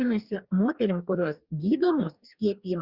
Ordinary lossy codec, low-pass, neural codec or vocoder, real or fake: Opus, 64 kbps; 5.4 kHz; codec, 44.1 kHz, 2.6 kbps, DAC; fake